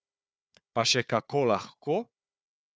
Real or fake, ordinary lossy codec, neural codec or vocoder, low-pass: fake; none; codec, 16 kHz, 4 kbps, FunCodec, trained on Chinese and English, 50 frames a second; none